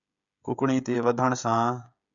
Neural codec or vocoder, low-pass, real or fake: codec, 16 kHz, 16 kbps, FreqCodec, smaller model; 7.2 kHz; fake